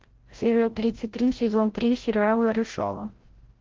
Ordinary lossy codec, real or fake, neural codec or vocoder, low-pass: Opus, 16 kbps; fake; codec, 16 kHz, 0.5 kbps, FreqCodec, larger model; 7.2 kHz